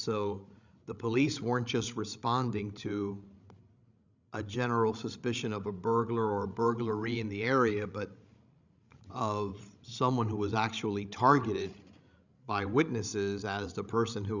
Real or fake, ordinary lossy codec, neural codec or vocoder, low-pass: fake; Opus, 64 kbps; codec, 16 kHz, 16 kbps, FreqCodec, larger model; 7.2 kHz